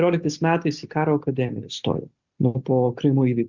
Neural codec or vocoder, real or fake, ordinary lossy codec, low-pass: none; real; Opus, 64 kbps; 7.2 kHz